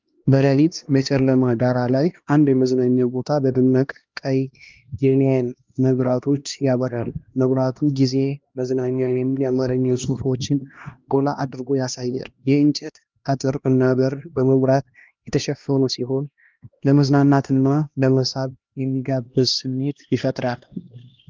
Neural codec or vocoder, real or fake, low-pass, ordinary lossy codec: codec, 16 kHz, 1 kbps, X-Codec, HuBERT features, trained on LibriSpeech; fake; 7.2 kHz; Opus, 24 kbps